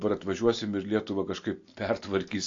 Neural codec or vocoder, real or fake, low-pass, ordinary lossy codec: none; real; 7.2 kHz; AAC, 48 kbps